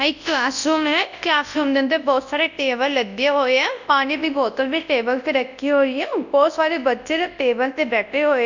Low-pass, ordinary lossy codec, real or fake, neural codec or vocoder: 7.2 kHz; none; fake; codec, 24 kHz, 0.9 kbps, WavTokenizer, large speech release